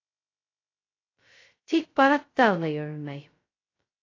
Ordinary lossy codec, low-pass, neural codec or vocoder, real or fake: AAC, 32 kbps; 7.2 kHz; codec, 16 kHz, 0.2 kbps, FocalCodec; fake